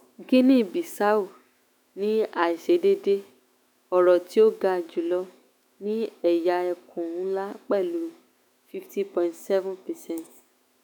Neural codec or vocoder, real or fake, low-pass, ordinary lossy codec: autoencoder, 48 kHz, 128 numbers a frame, DAC-VAE, trained on Japanese speech; fake; none; none